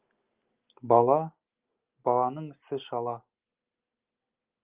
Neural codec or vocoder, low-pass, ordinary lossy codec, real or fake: none; 3.6 kHz; Opus, 24 kbps; real